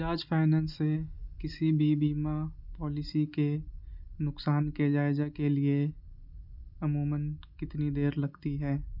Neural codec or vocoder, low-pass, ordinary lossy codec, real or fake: none; 5.4 kHz; none; real